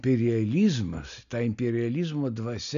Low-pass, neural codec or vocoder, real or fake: 7.2 kHz; none; real